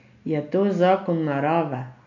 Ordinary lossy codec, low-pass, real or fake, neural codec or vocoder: none; 7.2 kHz; real; none